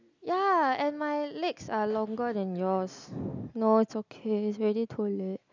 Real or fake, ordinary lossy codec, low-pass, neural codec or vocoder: real; none; 7.2 kHz; none